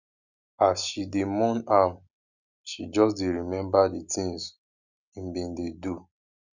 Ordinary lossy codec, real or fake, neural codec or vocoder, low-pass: none; real; none; 7.2 kHz